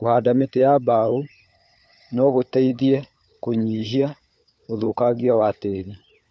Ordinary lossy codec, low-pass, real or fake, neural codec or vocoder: none; none; fake; codec, 16 kHz, 4 kbps, FunCodec, trained on LibriTTS, 50 frames a second